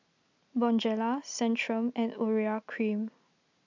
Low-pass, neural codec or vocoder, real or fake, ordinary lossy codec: 7.2 kHz; none; real; MP3, 64 kbps